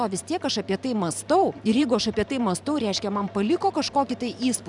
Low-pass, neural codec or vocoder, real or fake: 10.8 kHz; vocoder, 44.1 kHz, 128 mel bands every 512 samples, BigVGAN v2; fake